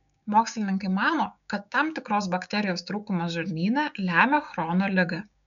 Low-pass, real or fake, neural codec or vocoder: 7.2 kHz; fake; codec, 16 kHz, 6 kbps, DAC